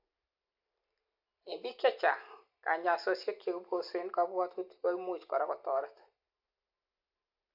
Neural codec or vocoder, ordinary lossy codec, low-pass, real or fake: none; AAC, 48 kbps; 5.4 kHz; real